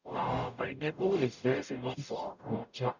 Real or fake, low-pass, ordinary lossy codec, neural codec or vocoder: fake; 7.2 kHz; none; codec, 44.1 kHz, 0.9 kbps, DAC